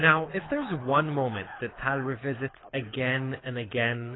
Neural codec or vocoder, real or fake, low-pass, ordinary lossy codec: codec, 24 kHz, 6 kbps, HILCodec; fake; 7.2 kHz; AAC, 16 kbps